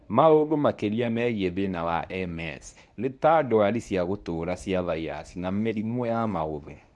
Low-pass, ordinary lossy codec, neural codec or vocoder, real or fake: none; none; codec, 24 kHz, 0.9 kbps, WavTokenizer, medium speech release version 1; fake